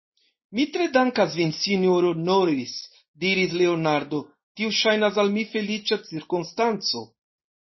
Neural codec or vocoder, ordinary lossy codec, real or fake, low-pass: none; MP3, 24 kbps; real; 7.2 kHz